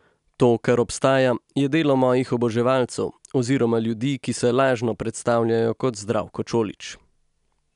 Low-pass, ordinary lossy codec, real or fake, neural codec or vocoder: 10.8 kHz; none; real; none